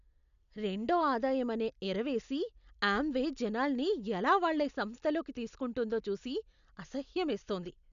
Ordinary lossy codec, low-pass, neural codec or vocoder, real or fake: none; 7.2 kHz; none; real